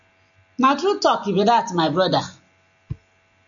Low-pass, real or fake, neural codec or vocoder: 7.2 kHz; real; none